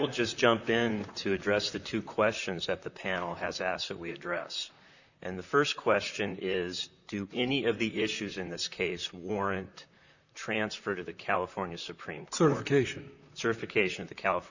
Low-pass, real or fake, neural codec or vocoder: 7.2 kHz; fake; vocoder, 44.1 kHz, 128 mel bands, Pupu-Vocoder